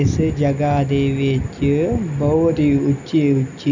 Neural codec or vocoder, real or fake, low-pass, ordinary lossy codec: none; real; 7.2 kHz; none